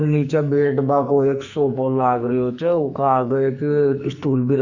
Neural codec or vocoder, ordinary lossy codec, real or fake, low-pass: codec, 44.1 kHz, 2.6 kbps, SNAC; Opus, 64 kbps; fake; 7.2 kHz